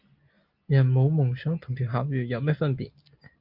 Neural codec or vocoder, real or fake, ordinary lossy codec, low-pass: none; real; Opus, 24 kbps; 5.4 kHz